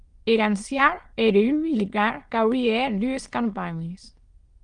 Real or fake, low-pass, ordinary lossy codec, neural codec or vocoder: fake; 9.9 kHz; Opus, 24 kbps; autoencoder, 22.05 kHz, a latent of 192 numbers a frame, VITS, trained on many speakers